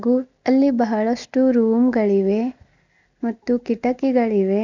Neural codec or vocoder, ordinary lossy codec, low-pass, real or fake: none; none; 7.2 kHz; real